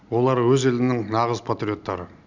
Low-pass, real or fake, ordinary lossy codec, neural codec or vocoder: 7.2 kHz; real; none; none